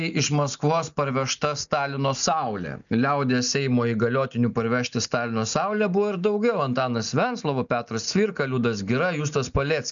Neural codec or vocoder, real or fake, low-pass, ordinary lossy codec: none; real; 7.2 kHz; MP3, 96 kbps